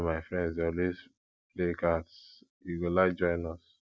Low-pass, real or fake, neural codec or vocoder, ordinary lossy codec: none; real; none; none